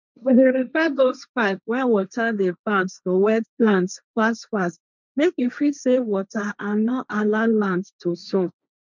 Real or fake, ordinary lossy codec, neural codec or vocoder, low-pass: fake; none; codec, 16 kHz, 1.1 kbps, Voila-Tokenizer; 7.2 kHz